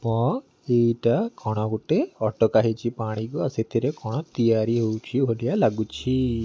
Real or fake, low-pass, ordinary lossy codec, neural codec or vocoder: real; none; none; none